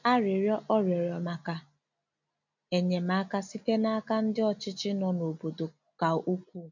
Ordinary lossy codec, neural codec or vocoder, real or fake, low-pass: none; none; real; 7.2 kHz